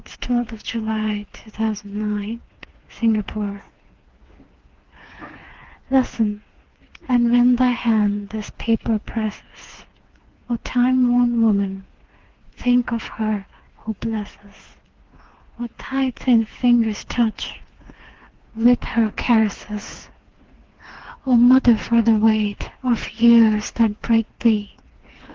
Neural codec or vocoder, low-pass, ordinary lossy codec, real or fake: codec, 16 kHz, 4 kbps, FreqCodec, smaller model; 7.2 kHz; Opus, 16 kbps; fake